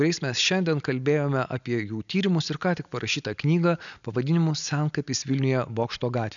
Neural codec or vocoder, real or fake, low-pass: none; real; 7.2 kHz